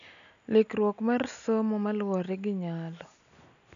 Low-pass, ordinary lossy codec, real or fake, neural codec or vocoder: 7.2 kHz; none; real; none